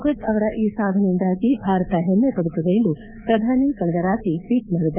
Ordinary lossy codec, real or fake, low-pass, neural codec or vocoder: none; fake; 3.6 kHz; codec, 24 kHz, 3.1 kbps, DualCodec